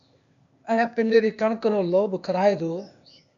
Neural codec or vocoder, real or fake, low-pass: codec, 16 kHz, 0.8 kbps, ZipCodec; fake; 7.2 kHz